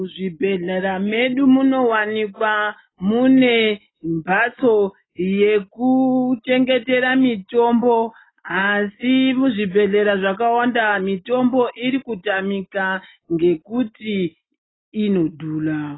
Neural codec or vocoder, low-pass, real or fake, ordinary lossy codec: none; 7.2 kHz; real; AAC, 16 kbps